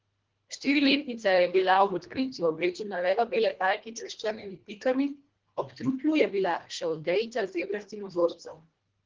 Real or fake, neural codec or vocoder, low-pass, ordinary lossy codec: fake; codec, 24 kHz, 1.5 kbps, HILCodec; 7.2 kHz; Opus, 16 kbps